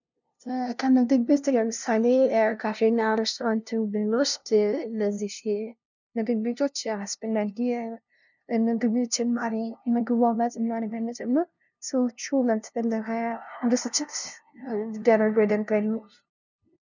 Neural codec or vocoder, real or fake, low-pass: codec, 16 kHz, 0.5 kbps, FunCodec, trained on LibriTTS, 25 frames a second; fake; 7.2 kHz